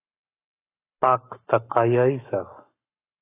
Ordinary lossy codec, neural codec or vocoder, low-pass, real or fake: AAC, 24 kbps; codec, 44.1 kHz, 7.8 kbps, Pupu-Codec; 3.6 kHz; fake